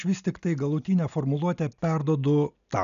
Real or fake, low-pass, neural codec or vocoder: real; 7.2 kHz; none